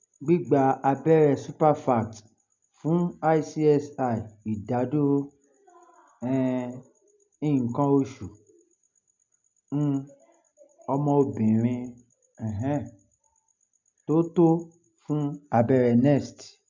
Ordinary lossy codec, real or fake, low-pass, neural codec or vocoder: MP3, 64 kbps; real; 7.2 kHz; none